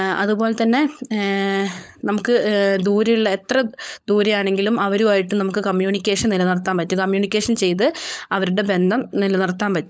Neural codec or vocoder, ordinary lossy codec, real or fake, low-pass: codec, 16 kHz, 16 kbps, FunCodec, trained on LibriTTS, 50 frames a second; none; fake; none